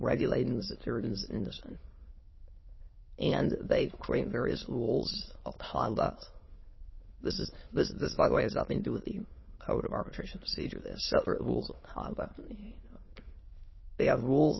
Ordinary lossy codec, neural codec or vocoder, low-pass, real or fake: MP3, 24 kbps; autoencoder, 22.05 kHz, a latent of 192 numbers a frame, VITS, trained on many speakers; 7.2 kHz; fake